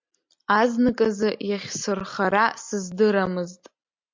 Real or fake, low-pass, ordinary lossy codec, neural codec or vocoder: real; 7.2 kHz; MP3, 48 kbps; none